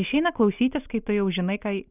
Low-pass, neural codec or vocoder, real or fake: 3.6 kHz; none; real